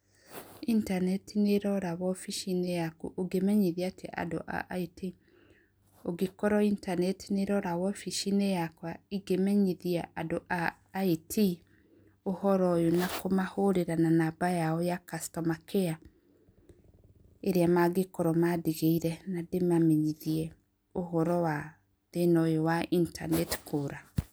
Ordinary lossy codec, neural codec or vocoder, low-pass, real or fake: none; none; none; real